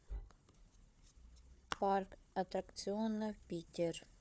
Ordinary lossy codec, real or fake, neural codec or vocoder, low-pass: none; fake; codec, 16 kHz, 4 kbps, FunCodec, trained on Chinese and English, 50 frames a second; none